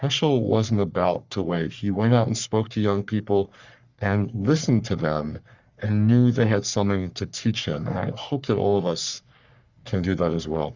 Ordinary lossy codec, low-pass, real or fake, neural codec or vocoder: Opus, 64 kbps; 7.2 kHz; fake; codec, 44.1 kHz, 3.4 kbps, Pupu-Codec